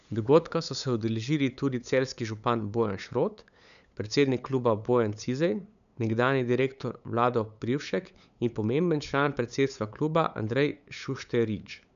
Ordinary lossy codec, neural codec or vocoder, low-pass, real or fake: none; codec, 16 kHz, 8 kbps, FunCodec, trained on LibriTTS, 25 frames a second; 7.2 kHz; fake